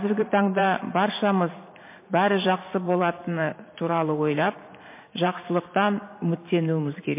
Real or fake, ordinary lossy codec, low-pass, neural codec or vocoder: fake; MP3, 24 kbps; 3.6 kHz; vocoder, 44.1 kHz, 128 mel bands every 512 samples, BigVGAN v2